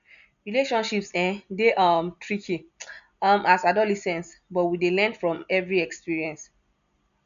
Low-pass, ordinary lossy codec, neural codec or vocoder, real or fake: 7.2 kHz; none; none; real